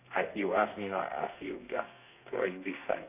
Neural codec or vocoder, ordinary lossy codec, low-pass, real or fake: codec, 32 kHz, 1.9 kbps, SNAC; none; 3.6 kHz; fake